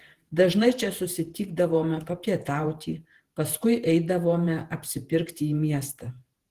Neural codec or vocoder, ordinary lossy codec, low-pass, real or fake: vocoder, 48 kHz, 128 mel bands, Vocos; Opus, 16 kbps; 14.4 kHz; fake